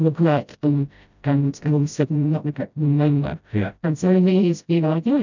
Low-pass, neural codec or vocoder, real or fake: 7.2 kHz; codec, 16 kHz, 0.5 kbps, FreqCodec, smaller model; fake